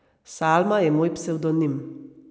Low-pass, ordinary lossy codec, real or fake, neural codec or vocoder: none; none; real; none